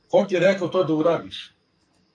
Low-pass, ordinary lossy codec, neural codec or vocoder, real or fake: 9.9 kHz; MP3, 48 kbps; codec, 44.1 kHz, 2.6 kbps, SNAC; fake